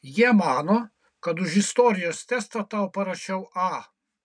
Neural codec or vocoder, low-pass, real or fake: none; 9.9 kHz; real